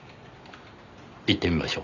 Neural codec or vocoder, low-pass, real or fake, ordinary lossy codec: none; 7.2 kHz; real; none